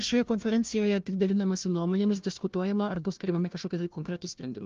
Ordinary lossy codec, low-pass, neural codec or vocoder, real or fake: Opus, 32 kbps; 7.2 kHz; codec, 16 kHz, 1 kbps, FunCodec, trained on Chinese and English, 50 frames a second; fake